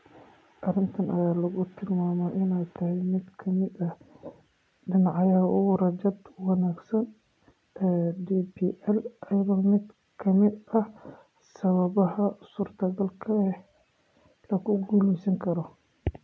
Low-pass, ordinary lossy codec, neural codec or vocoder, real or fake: none; none; none; real